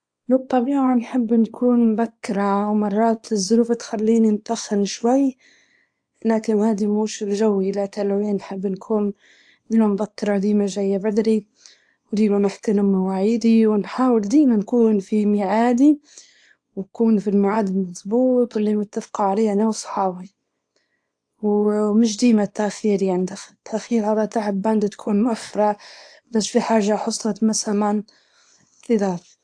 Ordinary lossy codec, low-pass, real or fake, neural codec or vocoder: AAC, 64 kbps; 9.9 kHz; fake; codec, 24 kHz, 0.9 kbps, WavTokenizer, small release